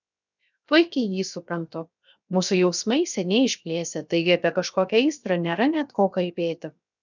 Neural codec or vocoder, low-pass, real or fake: codec, 16 kHz, 0.7 kbps, FocalCodec; 7.2 kHz; fake